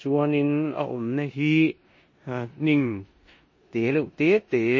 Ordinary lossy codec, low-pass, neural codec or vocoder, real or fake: MP3, 32 kbps; 7.2 kHz; codec, 16 kHz in and 24 kHz out, 0.9 kbps, LongCat-Audio-Codec, four codebook decoder; fake